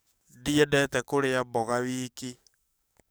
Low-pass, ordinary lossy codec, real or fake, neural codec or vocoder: none; none; fake; codec, 44.1 kHz, 7.8 kbps, DAC